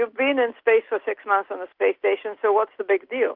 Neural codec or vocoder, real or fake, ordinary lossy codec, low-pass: none; real; Opus, 32 kbps; 5.4 kHz